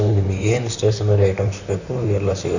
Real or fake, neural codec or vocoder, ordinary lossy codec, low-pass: fake; vocoder, 44.1 kHz, 128 mel bands, Pupu-Vocoder; none; 7.2 kHz